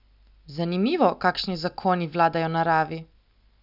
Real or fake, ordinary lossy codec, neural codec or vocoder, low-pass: real; none; none; 5.4 kHz